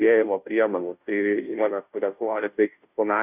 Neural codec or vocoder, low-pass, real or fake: codec, 16 kHz, 0.5 kbps, FunCodec, trained on Chinese and English, 25 frames a second; 3.6 kHz; fake